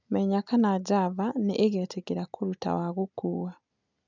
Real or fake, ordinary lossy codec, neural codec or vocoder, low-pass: real; none; none; 7.2 kHz